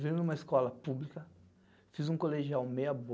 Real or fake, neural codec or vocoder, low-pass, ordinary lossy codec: real; none; none; none